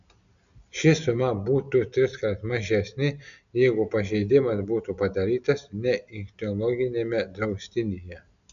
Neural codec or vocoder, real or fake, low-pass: none; real; 7.2 kHz